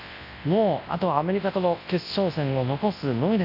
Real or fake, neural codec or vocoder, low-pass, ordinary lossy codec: fake; codec, 24 kHz, 0.9 kbps, WavTokenizer, large speech release; 5.4 kHz; AAC, 32 kbps